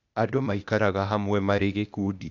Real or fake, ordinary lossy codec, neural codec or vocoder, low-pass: fake; none; codec, 16 kHz, 0.8 kbps, ZipCodec; 7.2 kHz